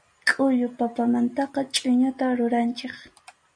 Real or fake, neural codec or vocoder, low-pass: real; none; 9.9 kHz